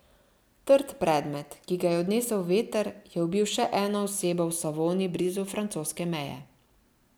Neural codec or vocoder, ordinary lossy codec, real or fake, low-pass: none; none; real; none